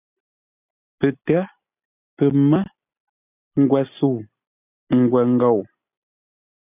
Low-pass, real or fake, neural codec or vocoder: 3.6 kHz; real; none